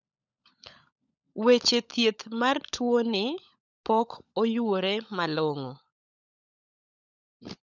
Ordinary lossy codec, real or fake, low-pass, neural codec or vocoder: none; fake; 7.2 kHz; codec, 16 kHz, 16 kbps, FunCodec, trained on LibriTTS, 50 frames a second